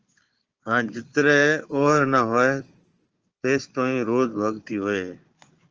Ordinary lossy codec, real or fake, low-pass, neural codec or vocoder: Opus, 24 kbps; fake; 7.2 kHz; codec, 16 kHz, 4 kbps, FunCodec, trained on Chinese and English, 50 frames a second